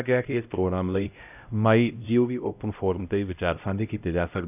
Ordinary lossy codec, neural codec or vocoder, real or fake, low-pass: none; codec, 16 kHz, 0.5 kbps, X-Codec, HuBERT features, trained on LibriSpeech; fake; 3.6 kHz